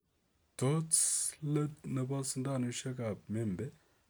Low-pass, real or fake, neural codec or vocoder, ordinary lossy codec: none; real; none; none